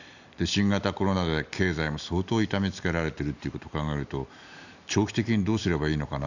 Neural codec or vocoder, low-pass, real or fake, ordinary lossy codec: none; 7.2 kHz; real; none